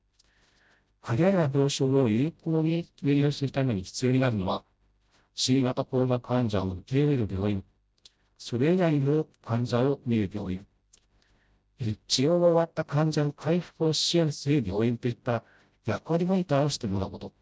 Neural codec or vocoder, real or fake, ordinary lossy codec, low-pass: codec, 16 kHz, 0.5 kbps, FreqCodec, smaller model; fake; none; none